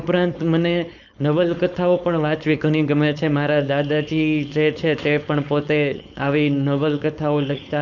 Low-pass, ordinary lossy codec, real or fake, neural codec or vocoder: 7.2 kHz; none; fake; codec, 16 kHz, 4.8 kbps, FACodec